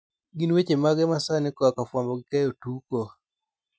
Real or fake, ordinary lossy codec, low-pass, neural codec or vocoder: real; none; none; none